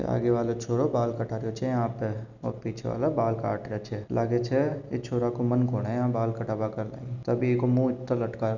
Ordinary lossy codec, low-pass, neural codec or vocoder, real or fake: none; 7.2 kHz; none; real